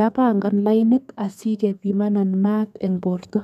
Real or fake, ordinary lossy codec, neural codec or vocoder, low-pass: fake; none; codec, 32 kHz, 1.9 kbps, SNAC; 14.4 kHz